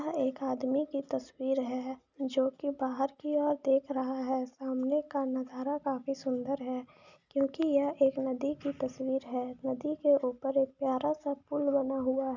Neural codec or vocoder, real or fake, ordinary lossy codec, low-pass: none; real; none; 7.2 kHz